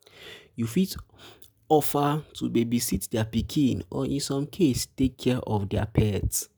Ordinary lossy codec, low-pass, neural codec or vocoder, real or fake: none; none; none; real